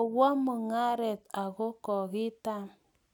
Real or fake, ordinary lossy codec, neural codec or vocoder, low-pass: real; none; none; 19.8 kHz